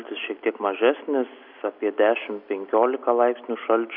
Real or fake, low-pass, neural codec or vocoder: real; 5.4 kHz; none